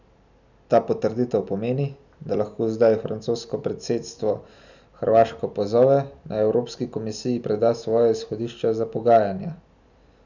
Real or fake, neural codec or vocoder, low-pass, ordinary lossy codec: real; none; 7.2 kHz; none